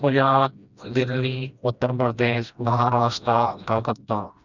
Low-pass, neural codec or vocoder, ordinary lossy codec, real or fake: 7.2 kHz; codec, 16 kHz, 1 kbps, FreqCodec, smaller model; none; fake